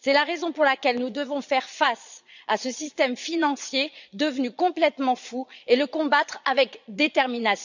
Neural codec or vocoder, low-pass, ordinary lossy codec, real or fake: vocoder, 22.05 kHz, 80 mel bands, Vocos; 7.2 kHz; none; fake